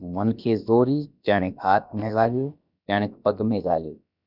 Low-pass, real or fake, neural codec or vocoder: 5.4 kHz; fake; codec, 16 kHz, about 1 kbps, DyCAST, with the encoder's durations